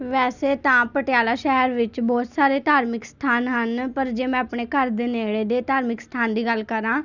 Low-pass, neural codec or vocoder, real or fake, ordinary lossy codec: 7.2 kHz; none; real; none